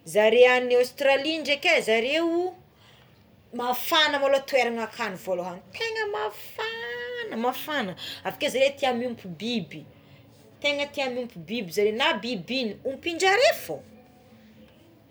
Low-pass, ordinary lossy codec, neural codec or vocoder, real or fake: none; none; none; real